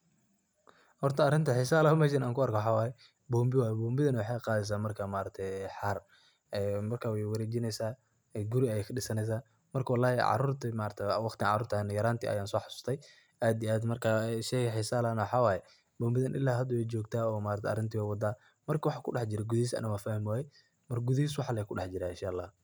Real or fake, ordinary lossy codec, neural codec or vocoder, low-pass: real; none; none; none